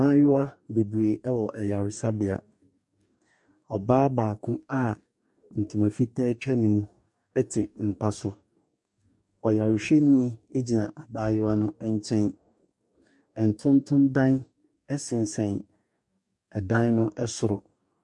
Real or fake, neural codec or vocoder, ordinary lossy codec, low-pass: fake; codec, 44.1 kHz, 2.6 kbps, DAC; MP3, 64 kbps; 10.8 kHz